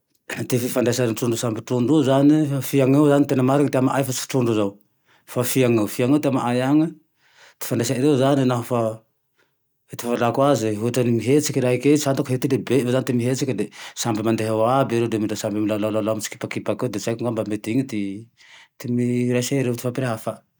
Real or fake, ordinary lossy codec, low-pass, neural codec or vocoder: real; none; none; none